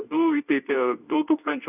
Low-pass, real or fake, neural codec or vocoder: 3.6 kHz; fake; codec, 24 kHz, 0.9 kbps, WavTokenizer, medium speech release version 2